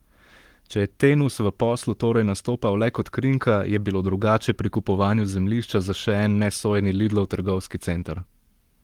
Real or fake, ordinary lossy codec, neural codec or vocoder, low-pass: fake; Opus, 24 kbps; codec, 44.1 kHz, 7.8 kbps, Pupu-Codec; 19.8 kHz